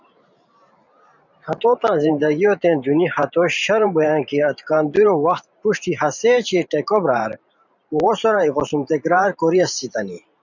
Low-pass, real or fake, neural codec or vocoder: 7.2 kHz; fake; vocoder, 44.1 kHz, 128 mel bands every 512 samples, BigVGAN v2